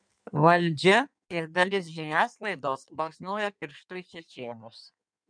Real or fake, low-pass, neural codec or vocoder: fake; 9.9 kHz; codec, 16 kHz in and 24 kHz out, 1.1 kbps, FireRedTTS-2 codec